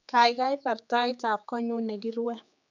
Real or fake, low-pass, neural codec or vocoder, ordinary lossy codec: fake; 7.2 kHz; codec, 16 kHz, 4 kbps, X-Codec, HuBERT features, trained on general audio; none